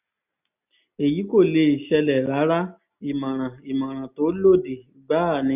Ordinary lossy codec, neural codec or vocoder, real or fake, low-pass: none; none; real; 3.6 kHz